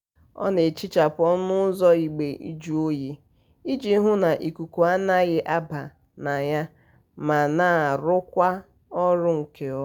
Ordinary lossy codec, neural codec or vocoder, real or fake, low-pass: none; none; real; 19.8 kHz